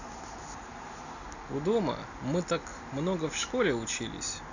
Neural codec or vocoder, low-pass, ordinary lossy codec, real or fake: none; 7.2 kHz; none; real